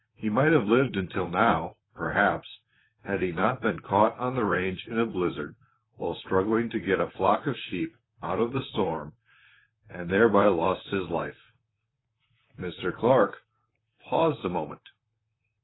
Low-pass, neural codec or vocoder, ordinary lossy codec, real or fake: 7.2 kHz; vocoder, 44.1 kHz, 128 mel bands, Pupu-Vocoder; AAC, 16 kbps; fake